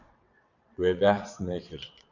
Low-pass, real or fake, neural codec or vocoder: 7.2 kHz; fake; vocoder, 22.05 kHz, 80 mel bands, WaveNeXt